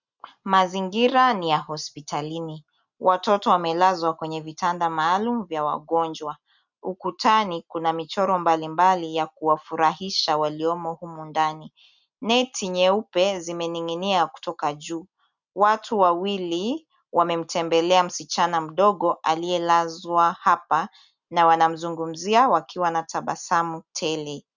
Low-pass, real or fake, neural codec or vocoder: 7.2 kHz; real; none